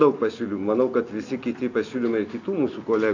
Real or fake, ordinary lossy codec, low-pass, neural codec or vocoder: real; AAC, 48 kbps; 7.2 kHz; none